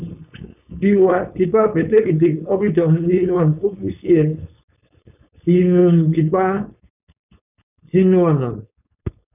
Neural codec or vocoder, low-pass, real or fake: codec, 16 kHz, 4.8 kbps, FACodec; 3.6 kHz; fake